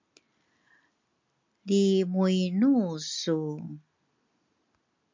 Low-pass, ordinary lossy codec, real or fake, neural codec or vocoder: 7.2 kHz; MP3, 64 kbps; real; none